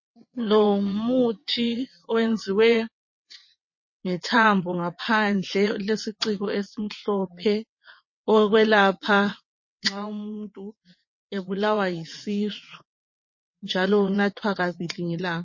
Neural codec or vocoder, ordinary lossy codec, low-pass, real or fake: vocoder, 22.05 kHz, 80 mel bands, WaveNeXt; MP3, 32 kbps; 7.2 kHz; fake